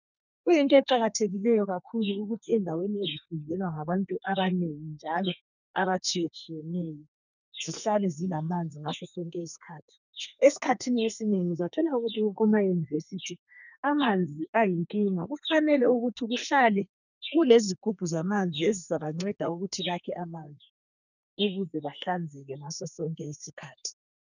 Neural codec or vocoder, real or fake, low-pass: codec, 32 kHz, 1.9 kbps, SNAC; fake; 7.2 kHz